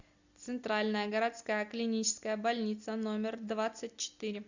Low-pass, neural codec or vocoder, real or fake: 7.2 kHz; none; real